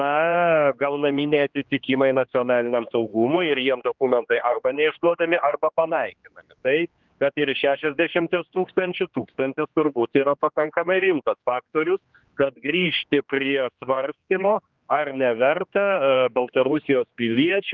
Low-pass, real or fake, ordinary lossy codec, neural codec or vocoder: 7.2 kHz; fake; Opus, 24 kbps; codec, 16 kHz, 2 kbps, X-Codec, HuBERT features, trained on general audio